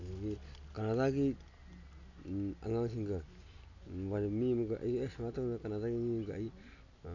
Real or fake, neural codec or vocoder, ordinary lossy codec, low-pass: real; none; none; 7.2 kHz